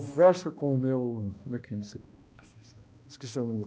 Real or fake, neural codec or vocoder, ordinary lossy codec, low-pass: fake; codec, 16 kHz, 1 kbps, X-Codec, HuBERT features, trained on balanced general audio; none; none